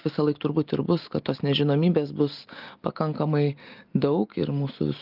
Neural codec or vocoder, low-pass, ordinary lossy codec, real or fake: none; 5.4 kHz; Opus, 32 kbps; real